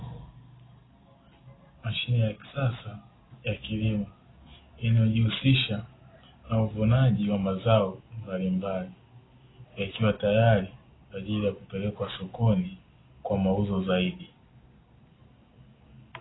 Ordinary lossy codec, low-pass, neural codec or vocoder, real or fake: AAC, 16 kbps; 7.2 kHz; none; real